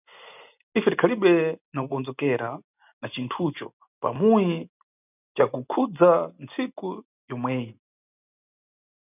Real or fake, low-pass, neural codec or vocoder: real; 3.6 kHz; none